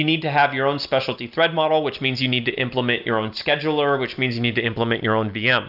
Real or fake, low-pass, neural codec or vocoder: real; 5.4 kHz; none